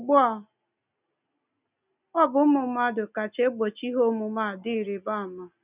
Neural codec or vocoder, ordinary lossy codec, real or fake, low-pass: none; none; real; 3.6 kHz